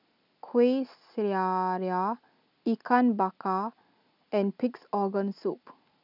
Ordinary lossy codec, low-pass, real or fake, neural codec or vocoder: none; 5.4 kHz; real; none